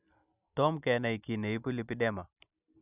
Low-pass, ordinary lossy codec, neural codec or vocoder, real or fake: 3.6 kHz; none; none; real